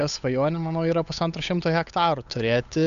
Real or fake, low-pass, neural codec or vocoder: fake; 7.2 kHz; codec, 16 kHz, 8 kbps, FunCodec, trained on Chinese and English, 25 frames a second